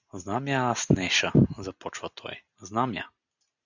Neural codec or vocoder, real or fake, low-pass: none; real; 7.2 kHz